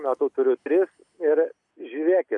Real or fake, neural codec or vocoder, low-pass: real; none; 10.8 kHz